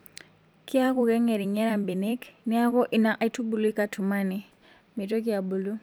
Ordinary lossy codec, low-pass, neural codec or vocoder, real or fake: none; none; vocoder, 44.1 kHz, 128 mel bands every 256 samples, BigVGAN v2; fake